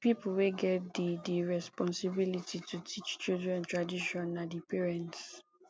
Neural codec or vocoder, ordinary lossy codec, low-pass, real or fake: none; none; none; real